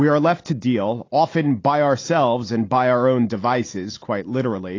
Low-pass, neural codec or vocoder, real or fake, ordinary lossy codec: 7.2 kHz; none; real; AAC, 32 kbps